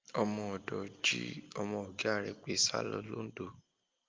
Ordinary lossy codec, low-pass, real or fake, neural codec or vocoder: Opus, 24 kbps; 7.2 kHz; real; none